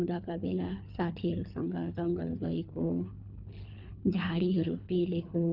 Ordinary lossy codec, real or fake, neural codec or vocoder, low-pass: none; fake; codec, 24 kHz, 3 kbps, HILCodec; 5.4 kHz